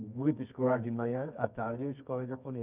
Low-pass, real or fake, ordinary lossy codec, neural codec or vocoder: 3.6 kHz; fake; none; codec, 24 kHz, 0.9 kbps, WavTokenizer, medium music audio release